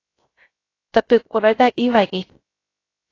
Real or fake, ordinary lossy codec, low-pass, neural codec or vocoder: fake; AAC, 32 kbps; 7.2 kHz; codec, 16 kHz, 0.3 kbps, FocalCodec